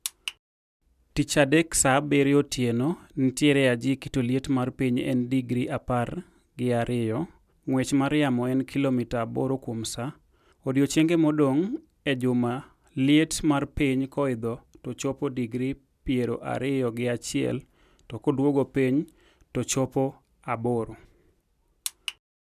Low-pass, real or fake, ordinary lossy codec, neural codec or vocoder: 14.4 kHz; real; none; none